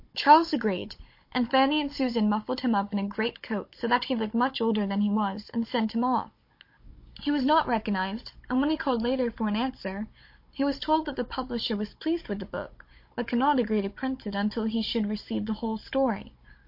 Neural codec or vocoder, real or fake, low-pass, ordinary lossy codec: codec, 16 kHz, 16 kbps, FunCodec, trained on Chinese and English, 50 frames a second; fake; 5.4 kHz; MP3, 32 kbps